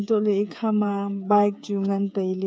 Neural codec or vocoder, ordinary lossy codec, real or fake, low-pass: codec, 16 kHz, 8 kbps, FreqCodec, smaller model; none; fake; none